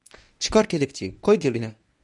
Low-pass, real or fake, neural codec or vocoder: 10.8 kHz; fake; codec, 24 kHz, 0.9 kbps, WavTokenizer, medium speech release version 1